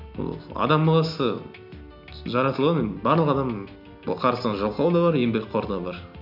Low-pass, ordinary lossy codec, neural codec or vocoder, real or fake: 5.4 kHz; none; none; real